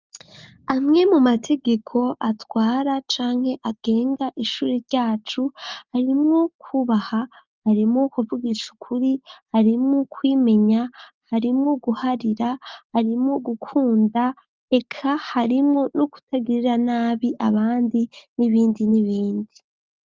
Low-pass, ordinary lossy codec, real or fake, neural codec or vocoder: 7.2 kHz; Opus, 24 kbps; real; none